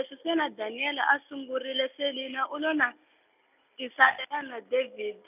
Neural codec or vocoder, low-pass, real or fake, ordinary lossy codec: none; 3.6 kHz; real; none